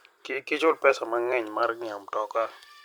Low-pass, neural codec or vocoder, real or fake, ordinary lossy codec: none; vocoder, 44.1 kHz, 128 mel bands every 256 samples, BigVGAN v2; fake; none